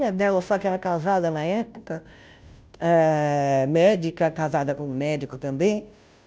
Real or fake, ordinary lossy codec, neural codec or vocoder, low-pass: fake; none; codec, 16 kHz, 0.5 kbps, FunCodec, trained on Chinese and English, 25 frames a second; none